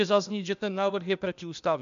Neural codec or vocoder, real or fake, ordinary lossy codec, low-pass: codec, 16 kHz, 0.8 kbps, ZipCodec; fake; AAC, 96 kbps; 7.2 kHz